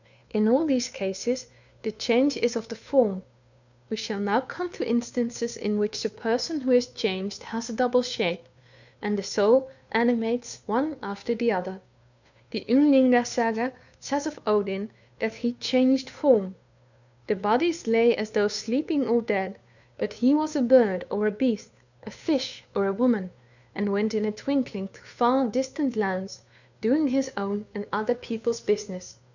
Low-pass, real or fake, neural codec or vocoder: 7.2 kHz; fake; codec, 16 kHz, 2 kbps, FunCodec, trained on Chinese and English, 25 frames a second